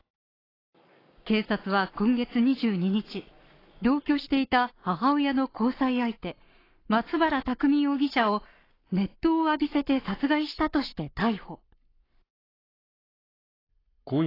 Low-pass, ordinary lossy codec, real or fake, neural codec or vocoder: 5.4 kHz; AAC, 24 kbps; fake; codec, 44.1 kHz, 7.8 kbps, Pupu-Codec